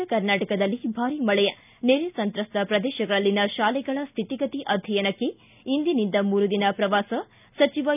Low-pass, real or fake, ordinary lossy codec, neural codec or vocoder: 3.6 kHz; real; none; none